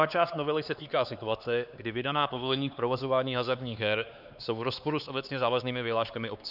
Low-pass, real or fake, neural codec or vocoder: 5.4 kHz; fake; codec, 16 kHz, 4 kbps, X-Codec, HuBERT features, trained on LibriSpeech